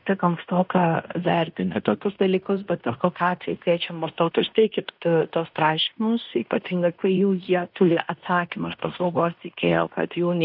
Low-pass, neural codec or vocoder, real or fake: 5.4 kHz; codec, 16 kHz in and 24 kHz out, 0.9 kbps, LongCat-Audio-Codec, fine tuned four codebook decoder; fake